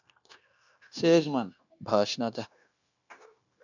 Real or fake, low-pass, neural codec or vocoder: fake; 7.2 kHz; codec, 16 kHz, 0.9 kbps, LongCat-Audio-Codec